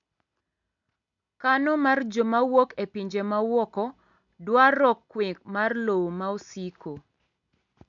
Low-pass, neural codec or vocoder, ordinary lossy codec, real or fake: 7.2 kHz; none; none; real